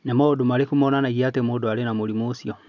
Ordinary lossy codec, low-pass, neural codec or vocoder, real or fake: none; 7.2 kHz; none; real